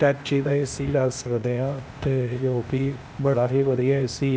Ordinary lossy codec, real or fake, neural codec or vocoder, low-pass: none; fake; codec, 16 kHz, 0.8 kbps, ZipCodec; none